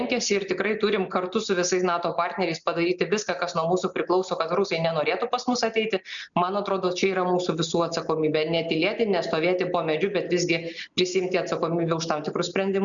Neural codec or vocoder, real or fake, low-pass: none; real; 7.2 kHz